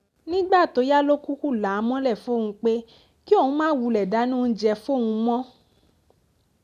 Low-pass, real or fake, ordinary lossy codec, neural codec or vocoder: 14.4 kHz; real; none; none